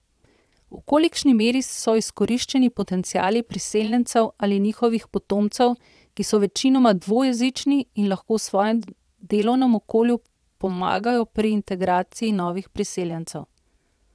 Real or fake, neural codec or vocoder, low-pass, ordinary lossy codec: fake; vocoder, 22.05 kHz, 80 mel bands, Vocos; none; none